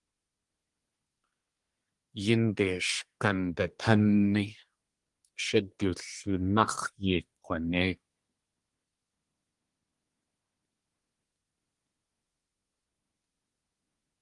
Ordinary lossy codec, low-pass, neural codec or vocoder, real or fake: Opus, 24 kbps; 10.8 kHz; codec, 24 kHz, 1 kbps, SNAC; fake